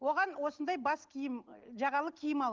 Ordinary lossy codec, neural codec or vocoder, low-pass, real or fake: Opus, 16 kbps; none; 7.2 kHz; real